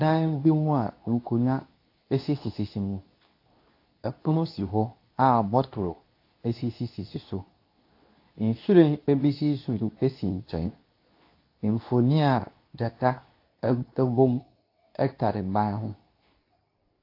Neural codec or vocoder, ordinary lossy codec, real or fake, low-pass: codec, 24 kHz, 0.9 kbps, WavTokenizer, medium speech release version 2; AAC, 32 kbps; fake; 5.4 kHz